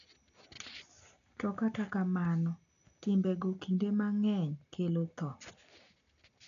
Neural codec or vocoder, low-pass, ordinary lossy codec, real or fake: none; 7.2 kHz; none; real